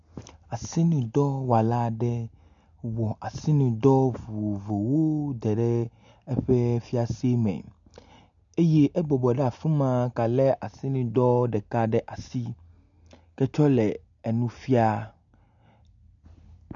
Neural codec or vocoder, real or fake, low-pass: none; real; 7.2 kHz